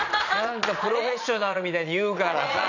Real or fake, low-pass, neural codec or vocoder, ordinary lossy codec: fake; 7.2 kHz; vocoder, 22.05 kHz, 80 mel bands, Vocos; none